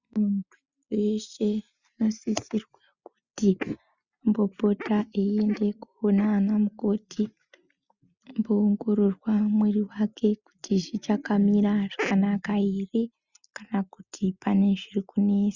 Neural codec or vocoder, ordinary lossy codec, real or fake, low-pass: none; Opus, 64 kbps; real; 7.2 kHz